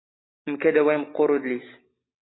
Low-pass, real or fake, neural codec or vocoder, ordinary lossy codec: 7.2 kHz; real; none; AAC, 16 kbps